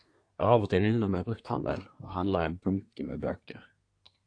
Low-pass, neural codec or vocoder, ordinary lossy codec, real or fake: 9.9 kHz; codec, 24 kHz, 1 kbps, SNAC; AAC, 48 kbps; fake